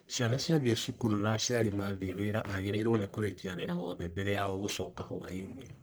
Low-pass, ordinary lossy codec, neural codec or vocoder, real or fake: none; none; codec, 44.1 kHz, 1.7 kbps, Pupu-Codec; fake